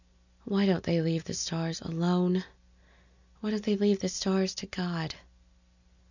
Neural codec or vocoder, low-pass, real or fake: none; 7.2 kHz; real